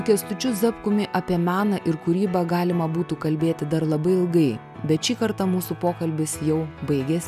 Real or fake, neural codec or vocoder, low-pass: real; none; 14.4 kHz